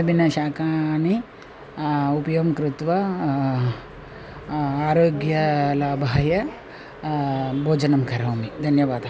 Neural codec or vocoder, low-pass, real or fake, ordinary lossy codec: none; none; real; none